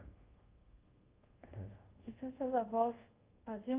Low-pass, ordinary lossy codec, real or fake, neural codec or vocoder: 3.6 kHz; Opus, 32 kbps; fake; codec, 24 kHz, 0.5 kbps, DualCodec